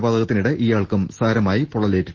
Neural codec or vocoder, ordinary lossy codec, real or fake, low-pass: none; Opus, 16 kbps; real; 7.2 kHz